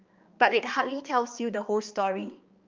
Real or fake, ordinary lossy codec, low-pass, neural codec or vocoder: fake; Opus, 24 kbps; 7.2 kHz; codec, 16 kHz, 2 kbps, X-Codec, HuBERT features, trained on balanced general audio